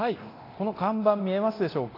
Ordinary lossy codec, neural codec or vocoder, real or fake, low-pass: AAC, 32 kbps; codec, 24 kHz, 0.9 kbps, DualCodec; fake; 5.4 kHz